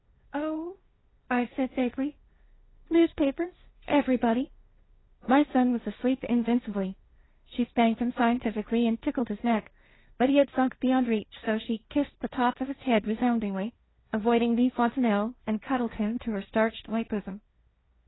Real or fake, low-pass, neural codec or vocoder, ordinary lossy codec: fake; 7.2 kHz; codec, 16 kHz, 1.1 kbps, Voila-Tokenizer; AAC, 16 kbps